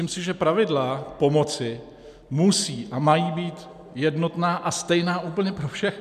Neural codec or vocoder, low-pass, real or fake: none; 14.4 kHz; real